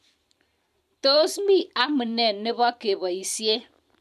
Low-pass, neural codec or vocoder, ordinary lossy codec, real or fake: 14.4 kHz; vocoder, 44.1 kHz, 128 mel bands every 512 samples, BigVGAN v2; none; fake